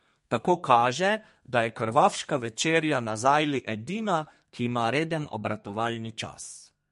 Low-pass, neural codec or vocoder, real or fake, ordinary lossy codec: 14.4 kHz; codec, 32 kHz, 1.9 kbps, SNAC; fake; MP3, 48 kbps